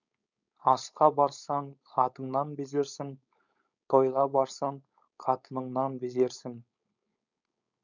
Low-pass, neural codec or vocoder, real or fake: 7.2 kHz; codec, 16 kHz, 4.8 kbps, FACodec; fake